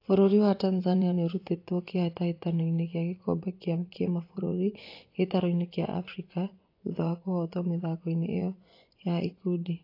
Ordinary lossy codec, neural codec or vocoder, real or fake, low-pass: AAC, 32 kbps; vocoder, 24 kHz, 100 mel bands, Vocos; fake; 5.4 kHz